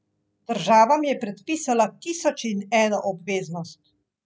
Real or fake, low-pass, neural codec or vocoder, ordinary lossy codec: real; none; none; none